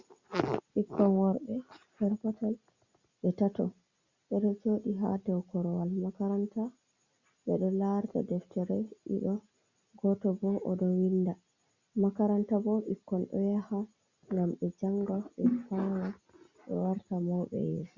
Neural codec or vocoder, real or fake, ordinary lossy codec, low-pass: none; real; Opus, 64 kbps; 7.2 kHz